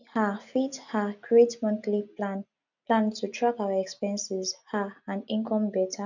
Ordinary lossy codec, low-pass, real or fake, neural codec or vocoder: none; 7.2 kHz; real; none